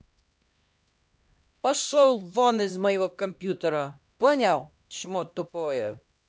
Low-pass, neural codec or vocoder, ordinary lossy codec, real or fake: none; codec, 16 kHz, 1 kbps, X-Codec, HuBERT features, trained on LibriSpeech; none; fake